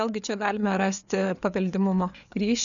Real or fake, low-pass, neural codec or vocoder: fake; 7.2 kHz; codec, 16 kHz, 4 kbps, FreqCodec, larger model